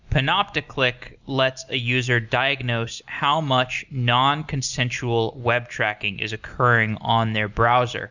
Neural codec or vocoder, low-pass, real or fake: none; 7.2 kHz; real